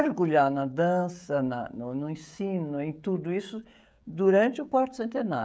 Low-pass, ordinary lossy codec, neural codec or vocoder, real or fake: none; none; codec, 16 kHz, 16 kbps, FreqCodec, smaller model; fake